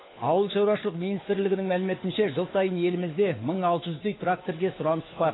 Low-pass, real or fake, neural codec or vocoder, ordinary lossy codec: 7.2 kHz; fake; codec, 16 kHz, 4 kbps, FunCodec, trained on LibriTTS, 50 frames a second; AAC, 16 kbps